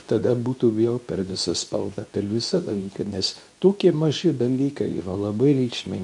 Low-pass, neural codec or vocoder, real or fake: 10.8 kHz; codec, 24 kHz, 0.9 kbps, WavTokenizer, medium speech release version 2; fake